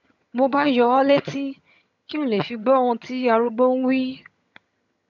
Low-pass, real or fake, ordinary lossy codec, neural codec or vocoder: 7.2 kHz; fake; none; vocoder, 22.05 kHz, 80 mel bands, HiFi-GAN